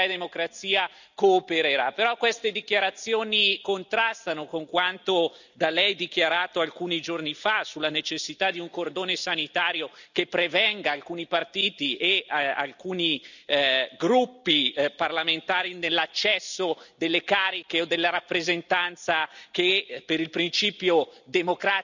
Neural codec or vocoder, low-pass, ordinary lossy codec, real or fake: none; 7.2 kHz; none; real